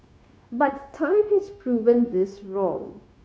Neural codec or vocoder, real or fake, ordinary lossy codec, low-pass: codec, 16 kHz, 0.9 kbps, LongCat-Audio-Codec; fake; none; none